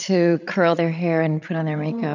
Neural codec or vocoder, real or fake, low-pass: codec, 16 kHz, 8 kbps, FreqCodec, larger model; fake; 7.2 kHz